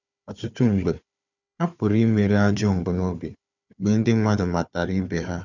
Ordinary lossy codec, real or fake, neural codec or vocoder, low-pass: none; fake; codec, 16 kHz, 4 kbps, FunCodec, trained on Chinese and English, 50 frames a second; 7.2 kHz